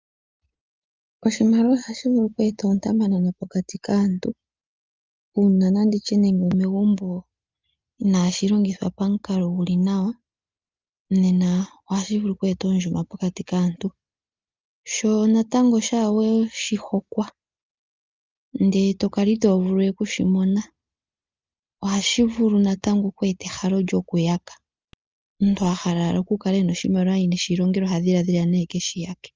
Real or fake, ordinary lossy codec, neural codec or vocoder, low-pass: real; Opus, 24 kbps; none; 7.2 kHz